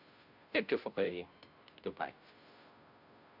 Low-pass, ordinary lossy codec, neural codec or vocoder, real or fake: 5.4 kHz; none; codec, 16 kHz, 0.5 kbps, FunCodec, trained on Chinese and English, 25 frames a second; fake